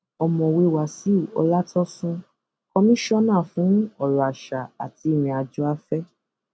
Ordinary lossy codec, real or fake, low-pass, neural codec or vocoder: none; real; none; none